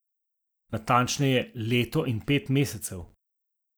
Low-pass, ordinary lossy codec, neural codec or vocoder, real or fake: none; none; none; real